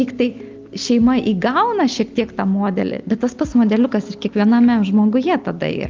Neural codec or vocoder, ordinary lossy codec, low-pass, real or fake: none; Opus, 32 kbps; 7.2 kHz; real